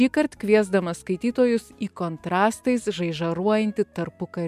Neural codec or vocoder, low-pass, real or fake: none; 14.4 kHz; real